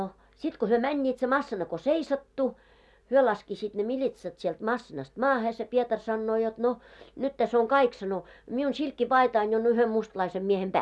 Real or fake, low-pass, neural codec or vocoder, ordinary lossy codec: real; none; none; none